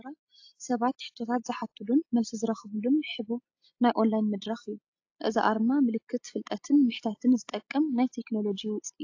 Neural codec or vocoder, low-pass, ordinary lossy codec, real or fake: none; 7.2 kHz; AAC, 48 kbps; real